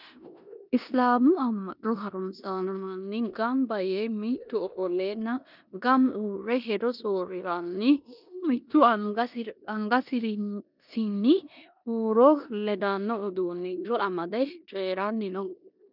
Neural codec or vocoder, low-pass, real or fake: codec, 16 kHz in and 24 kHz out, 0.9 kbps, LongCat-Audio-Codec, four codebook decoder; 5.4 kHz; fake